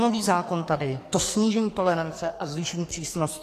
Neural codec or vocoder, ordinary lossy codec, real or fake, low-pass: codec, 44.1 kHz, 2.6 kbps, SNAC; AAC, 48 kbps; fake; 14.4 kHz